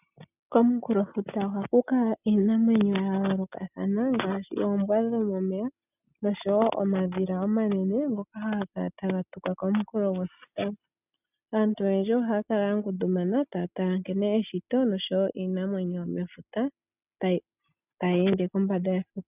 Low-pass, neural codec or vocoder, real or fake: 3.6 kHz; none; real